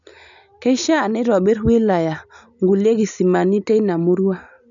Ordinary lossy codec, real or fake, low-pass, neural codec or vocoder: none; real; 7.2 kHz; none